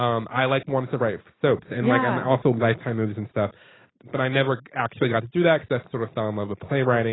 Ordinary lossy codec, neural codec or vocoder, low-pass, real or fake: AAC, 16 kbps; none; 7.2 kHz; real